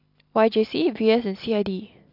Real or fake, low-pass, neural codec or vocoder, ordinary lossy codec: real; 5.4 kHz; none; AAC, 48 kbps